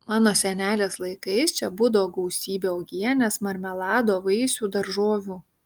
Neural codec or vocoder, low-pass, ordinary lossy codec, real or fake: none; 19.8 kHz; Opus, 32 kbps; real